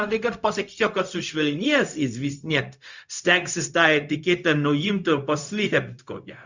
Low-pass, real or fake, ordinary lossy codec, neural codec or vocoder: 7.2 kHz; fake; Opus, 64 kbps; codec, 16 kHz, 0.4 kbps, LongCat-Audio-Codec